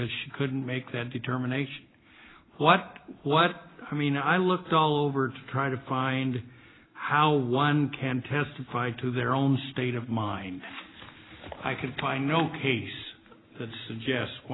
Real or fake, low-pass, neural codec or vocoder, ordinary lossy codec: real; 7.2 kHz; none; AAC, 16 kbps